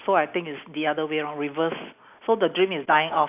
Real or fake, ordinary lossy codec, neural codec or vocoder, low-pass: real; none; none; 3.6 kHz